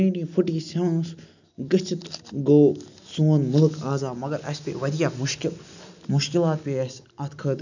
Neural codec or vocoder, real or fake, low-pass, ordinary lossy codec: none; real; 7.2 kHz; none